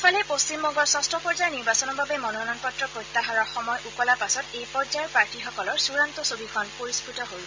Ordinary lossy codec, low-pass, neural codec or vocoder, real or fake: MP3, 48 kbps; 7.2 kHz; none; real